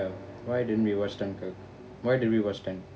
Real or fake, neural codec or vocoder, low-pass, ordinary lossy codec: real; none; none; none